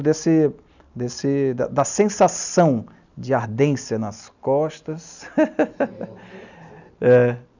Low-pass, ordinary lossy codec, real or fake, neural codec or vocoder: 7.2 kHz; none; real; none